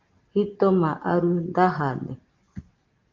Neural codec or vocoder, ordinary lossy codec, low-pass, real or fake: none; Opus, 32 kbps; 7.2 kHz; real